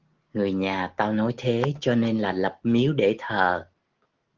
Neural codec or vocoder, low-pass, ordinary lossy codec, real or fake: none; 7.2 kHz; Opus, 16 kbps; real